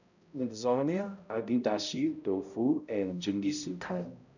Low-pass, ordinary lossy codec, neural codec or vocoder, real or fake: 7.2 kHz; none; codec, 16 kHz, 0.5 kbps, X-Codec, HuBERT features, trained on balanced general audio; fake